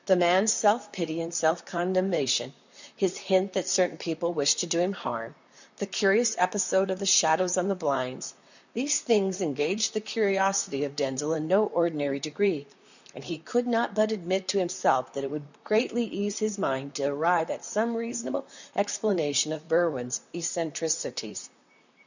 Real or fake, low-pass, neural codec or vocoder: fake; 7.2 kHz; vocoder, 44.1 kHz, 128 mel bands, Pupu-Vocoder